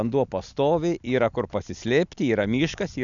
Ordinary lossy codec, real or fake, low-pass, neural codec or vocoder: MP3, 96 kbps; real; 7.2 kHz; none